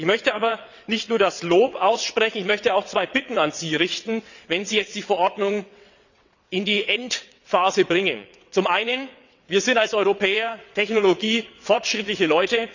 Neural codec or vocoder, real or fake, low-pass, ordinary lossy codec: vocoder, 22.05 kHz, 80 mel bands, WaveNeXt; fake; 7.2 kHz; none